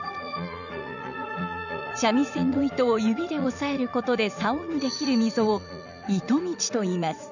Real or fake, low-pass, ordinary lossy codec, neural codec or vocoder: fake; 7.2 kHz; none; vocoder, 44.1 kHz, 80 mel bands, Vocos